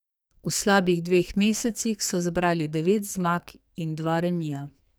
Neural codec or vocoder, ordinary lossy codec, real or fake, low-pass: codec, 44.1 kHz, 2.6 kbps, SNAC; none; fake; none